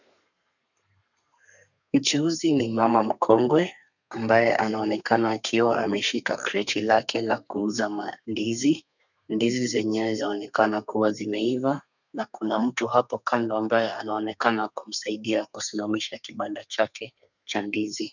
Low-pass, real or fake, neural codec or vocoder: 7.2 kHz; fake; codec, 32 kHz, 1.9 kbps, SNAC